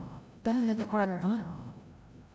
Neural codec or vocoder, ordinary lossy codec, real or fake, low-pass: codec, 16 kHz, 0.5 kbps, FreqCodec, larger model; none; fake; none